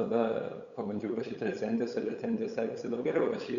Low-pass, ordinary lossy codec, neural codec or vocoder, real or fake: 7.2 kHz; Opus, 64 kbps; codec, 16 kHz, 8 kbps, FunCodec, trained on LibriTTS, 25 frames a second; fake